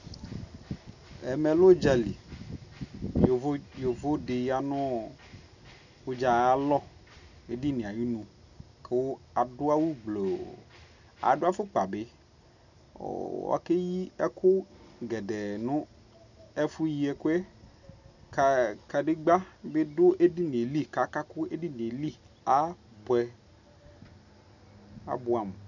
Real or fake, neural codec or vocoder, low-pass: real; none; 7.2 kHz